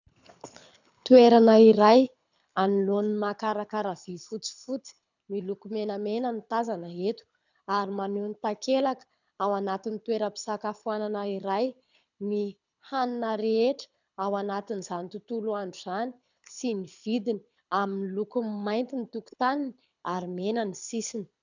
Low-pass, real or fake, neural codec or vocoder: 7.2 kHz; fake; codec, 24 kHz, 6 kbps, HILCodec